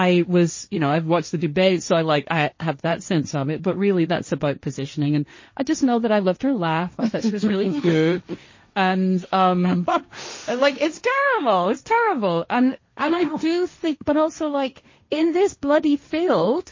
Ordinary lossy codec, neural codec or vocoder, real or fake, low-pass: MP3, 32 kbps; codec, 16 kHz, 1.1 kbps, Voila-Tokenizer; fake; 7.2 kHz